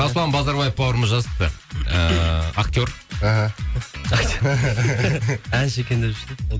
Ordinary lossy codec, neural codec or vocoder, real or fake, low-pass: none; none; real; none